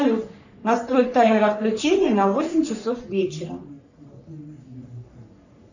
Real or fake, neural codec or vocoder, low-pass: fake; codec, 44.1 kHz, 3.4 kbps, Pupu-Codec; 7.2 kHz